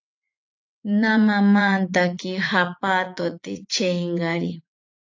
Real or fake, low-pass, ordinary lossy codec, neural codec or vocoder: fake; 7.2 kHz; AAC, 48 kbps; vocoder, 44.1 kHz, 80 mel bands, Vocos